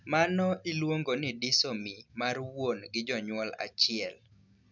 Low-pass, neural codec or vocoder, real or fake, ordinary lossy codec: 7.2 kHz; none; real; none